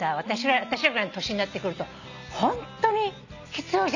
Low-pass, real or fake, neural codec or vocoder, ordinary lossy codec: 7.2 kHz; real; none; none